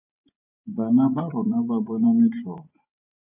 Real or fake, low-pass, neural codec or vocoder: fake; 3.6 kHz; vocoder, 24 kHz, 100 mel bands, Vocos